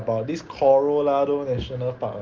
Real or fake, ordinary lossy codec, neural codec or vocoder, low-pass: real; Opus, 24 kbps; none; 7.2 kHz